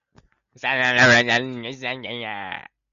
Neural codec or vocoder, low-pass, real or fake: none; 7.2 kHz; real